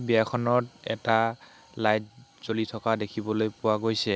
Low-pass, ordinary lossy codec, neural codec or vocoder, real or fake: none; none; none; real